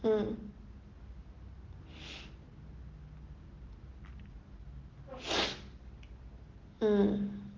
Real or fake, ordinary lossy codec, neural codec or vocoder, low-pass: real; Opus, 16 kbps; none; 7.2 kHz